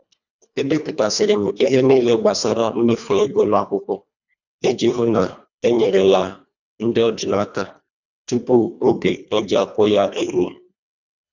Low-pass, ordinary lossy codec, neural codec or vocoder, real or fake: 7.2 kHz; none; codec, 24 kHz, 1.5 kbps, HILCodec; fake